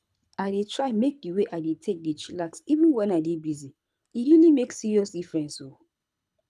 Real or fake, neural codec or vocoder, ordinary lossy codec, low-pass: fake; codec, 24 kHz, 6 kbps, HILCodec; none; none